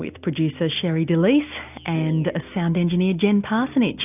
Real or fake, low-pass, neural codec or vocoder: real; 3.6 kHz; none